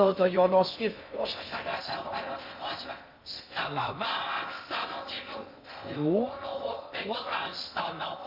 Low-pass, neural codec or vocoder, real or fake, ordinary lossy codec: 5.4 kHz; codec, 16 kHz in and 24 kHz out, 0.6 kbps, FocalCodec, streaming, 2048 codes; fake; MP3, 48 kbps